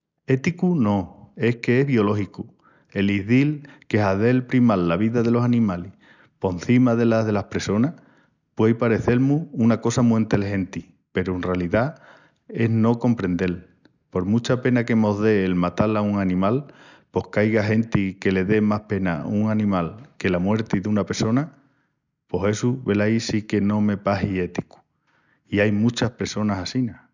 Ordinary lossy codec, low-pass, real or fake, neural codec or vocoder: none; 7.2 kHz; real; none